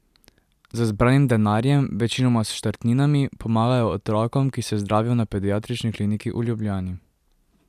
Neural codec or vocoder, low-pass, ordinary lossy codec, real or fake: none; 14.4 kHz; none; real